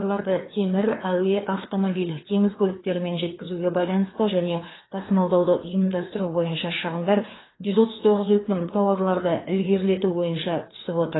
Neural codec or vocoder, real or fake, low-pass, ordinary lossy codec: codec, 16 kHz, 2 kbps, FreqCodec, larger model; fake; 7.2 kHz; AAC, 16 kbps